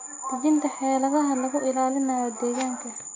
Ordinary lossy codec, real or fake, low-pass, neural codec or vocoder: AAC, 32 kbps; real; 7.2 kHz; none